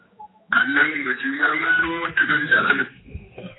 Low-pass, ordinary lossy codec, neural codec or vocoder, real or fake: 7.2 kHz; AAC, 16 kbps; codec, 16 kHz, 2 kbps, X-Codec, HuBERT features, trained on general audio; fake